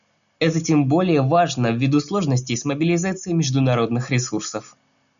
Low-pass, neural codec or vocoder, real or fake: 7.2 kHz; none; real